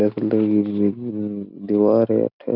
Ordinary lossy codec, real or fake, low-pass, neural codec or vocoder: none; real; 5.4 kHz; none